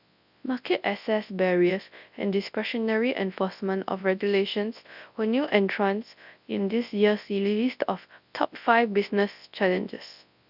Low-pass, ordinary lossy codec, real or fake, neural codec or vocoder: 5.4 kHz; none; fake; codec, 24 kHz, 0.9 kbps, WavTokenizer, large speech release